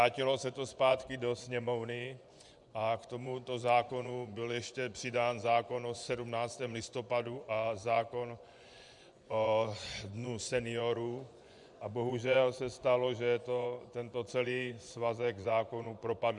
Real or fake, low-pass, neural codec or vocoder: fake; 10.8 kHz; vocoder, 24 kHz, 100 mel bands, Vocos